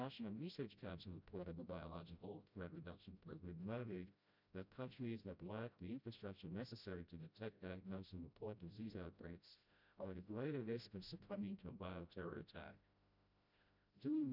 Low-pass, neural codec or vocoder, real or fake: 5.4 kHz; codec, 16 kHz, 0.5 kbps, FreqCodec, smaller model; fake